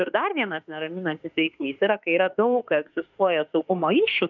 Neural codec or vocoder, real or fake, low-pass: autoencoder, 48 kHz, 32 numbers a frame, DAC-VAE, trained on Japanese speech; fake; 7.2 kHz